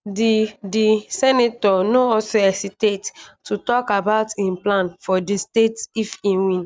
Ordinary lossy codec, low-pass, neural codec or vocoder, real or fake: none; none; none; real